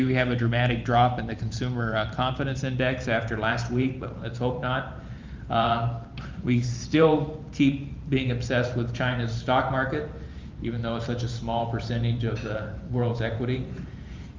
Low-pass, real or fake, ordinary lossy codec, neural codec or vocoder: 7.2 kHz; fake; Opus, 24 kbps; vocoder, 44.1 kHz, 128 mel bands every 512 samples, BigVGAN v2